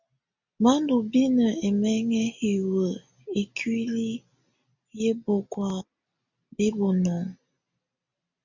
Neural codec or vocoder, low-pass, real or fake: none; 7.2 kHz; real